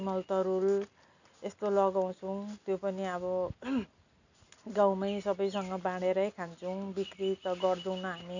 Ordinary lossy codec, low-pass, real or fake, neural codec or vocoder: none; 7.2 kHz; real; none